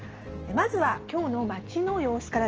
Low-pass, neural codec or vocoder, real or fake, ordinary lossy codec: 7.2 kHz; none; real; Opus, 16 kbps